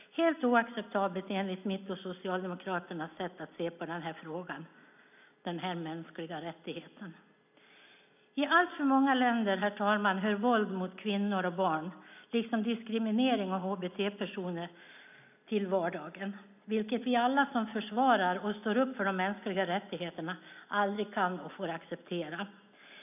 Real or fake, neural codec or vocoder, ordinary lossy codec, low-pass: real; none; none; 3.6 kHz